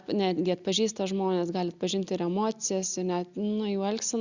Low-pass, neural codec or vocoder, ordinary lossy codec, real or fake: 7.2 kHz; none; Opus, 64 kbps; real